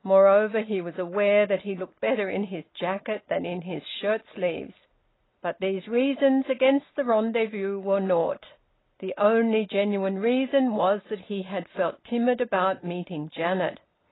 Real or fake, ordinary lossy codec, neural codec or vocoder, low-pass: real; AAC, 16 kbps; none; 7.2 kHz